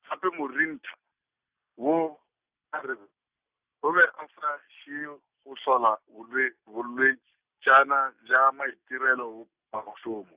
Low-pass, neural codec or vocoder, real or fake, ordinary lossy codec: 3.6 kHz; none; real; Opus, 64 kbps